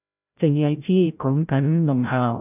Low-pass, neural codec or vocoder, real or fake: 3.6 kHz; codec, 16 kHz, 0.5 kbps, FreqCodec, larger model; fake